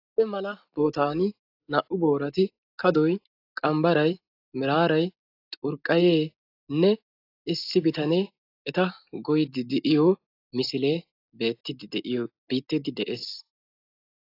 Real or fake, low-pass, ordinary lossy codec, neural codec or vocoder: real; 5.4 kHz; AAC, 32 kbps; none